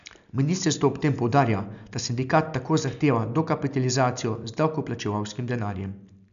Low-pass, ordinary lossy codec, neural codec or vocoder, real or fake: 7.2 kHz; none; none; real